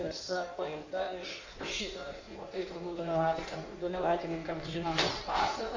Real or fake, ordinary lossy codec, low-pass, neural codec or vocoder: fake; AAC, 48 kbps; 7.2 kHz; codec, 16 kHz in and 24 kHz out, 1.1 kbps, FireRedTTS-2 codec